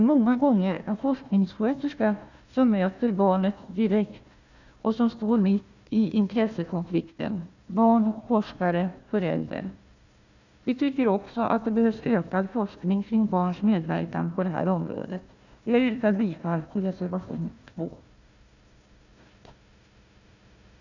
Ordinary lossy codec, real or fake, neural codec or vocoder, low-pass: none; fake; codec, 16 kHz, 1 kbps, FunCodec, trained on Chinese and English, 50 frames a second; 7.2 kHz